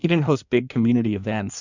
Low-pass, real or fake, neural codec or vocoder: 7.2 kHz; fake; codec, 16 kHz in and 24 kHz out, 1.1 kbps, FireRedTTS-2 codec